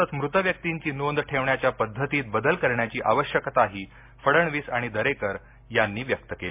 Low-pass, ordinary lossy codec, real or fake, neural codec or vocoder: 3.6 kHz; MP3, 32 kbps; real; none